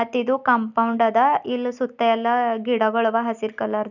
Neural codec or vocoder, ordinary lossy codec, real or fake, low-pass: none; none; real; 7.2 kHz